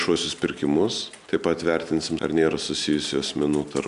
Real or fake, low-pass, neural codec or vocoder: real; 10.8 kHz; none